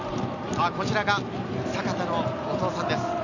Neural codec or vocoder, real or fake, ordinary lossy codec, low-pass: none; real; none; 7.2 kHz